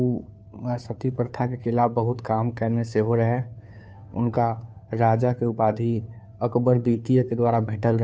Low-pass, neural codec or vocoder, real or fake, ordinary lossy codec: none; codec, 16 kHz, 2 kbps, FunCodec, trained on Chinese and English, 25 frames a second; fake; none